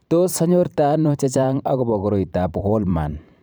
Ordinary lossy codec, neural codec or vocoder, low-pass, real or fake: none; vocoder, 44.1 kHz, 128 mel bands every 512 samples, BigVGAN v2; none; fake